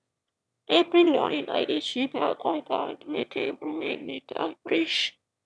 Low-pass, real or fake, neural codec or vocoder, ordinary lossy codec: none; fake; autoencoder, 22.05 kHz, a latent of 192 numbers a frame, VITS, trained on one speaker; none